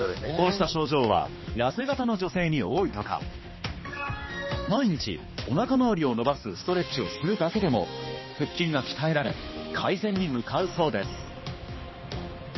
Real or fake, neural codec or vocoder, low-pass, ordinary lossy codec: fake; codec, 16 kHz, 2 kbps, X-Codec, HuBERT features, trained on balanced general audio; 7.2 kHz; MP3, 24 kbps